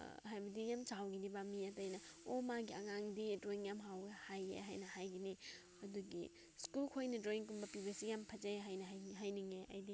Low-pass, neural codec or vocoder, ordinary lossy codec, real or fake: none; none; none; real